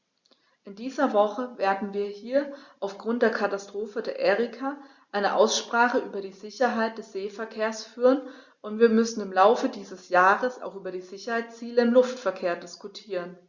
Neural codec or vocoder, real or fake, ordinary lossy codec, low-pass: none; real; Opus, 64 kbps; 7.2 kHz